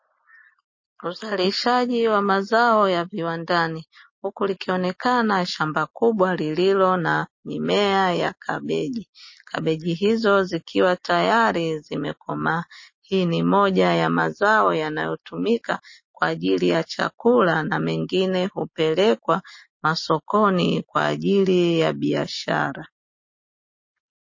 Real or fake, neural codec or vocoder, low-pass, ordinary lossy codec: real; none; 7.2 kHz; MP3, 32 kbps